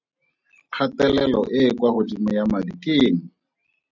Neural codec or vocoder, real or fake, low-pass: none; real; 7.2 kHz